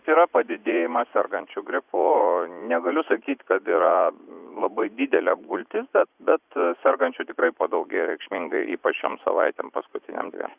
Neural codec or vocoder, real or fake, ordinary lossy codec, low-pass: vocoder, 44.1 kHz, 80 mel bands, Vocos; fake; Opus, 64 kbps; 3.6 kHz